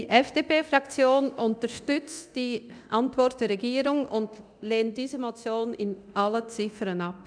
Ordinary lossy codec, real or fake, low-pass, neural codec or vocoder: none; fake; 9.9 kHz; codec, 24 kHz, 0.9 kbps, DualCodec